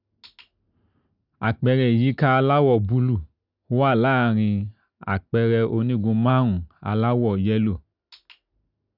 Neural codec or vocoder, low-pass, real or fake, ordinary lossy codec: none; 5.4 kHz; real; none